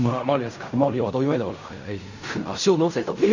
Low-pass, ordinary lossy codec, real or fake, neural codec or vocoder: 7.2 kHz; none; fake; codec, 16 kHz in and 24 kHz out, 0.4 kbps, LongCat-Audio-Codec, fine tuned four codebook decoder